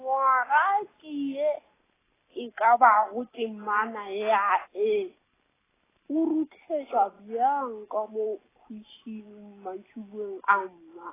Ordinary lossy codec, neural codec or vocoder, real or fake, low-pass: AAC, 16 kbps; none; real; 3.6 kHz